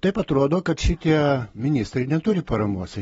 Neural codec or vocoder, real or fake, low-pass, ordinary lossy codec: none; real; 19.8 kHz; AAC, 24 kbps